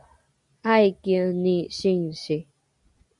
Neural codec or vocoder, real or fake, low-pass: none; real; 10.8 kHz